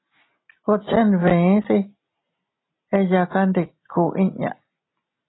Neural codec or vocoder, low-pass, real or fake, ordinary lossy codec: none; 7.2 kHz; real; AAC, 16 kbps